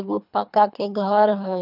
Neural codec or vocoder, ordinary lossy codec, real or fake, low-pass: codec, 24 kHz, 3 kbps, HILCodec; none; fake; 5.4 kHz